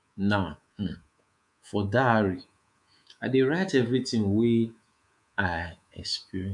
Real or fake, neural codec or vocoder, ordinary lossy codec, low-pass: fake; codec, 24 kHz, 3.1 kbps, DualCodec; none; 10.8 kHz